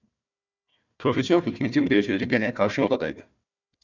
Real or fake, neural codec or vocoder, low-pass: fake; codec, 16 kHz, 1 kbps, FunCodec, trained on Chinese and English, 50 frames a second; 7.2 kHz